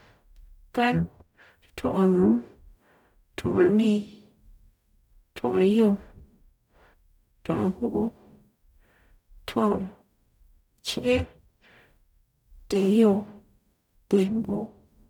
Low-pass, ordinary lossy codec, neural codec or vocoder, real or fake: 19.8 kHz; none; codec, 44.1 kHz, 0.9 kbps, DAC; fake